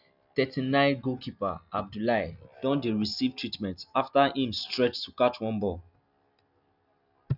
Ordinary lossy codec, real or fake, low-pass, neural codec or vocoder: none; real; 5.4 kHz; none